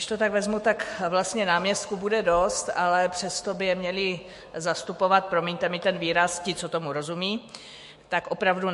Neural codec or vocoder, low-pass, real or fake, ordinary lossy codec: autoencoder, 48 kHz, 128 numbers a frame, DAC-VAE, trained on Japanese speech; 14.4 kHz; fake; MP3, 48 kbps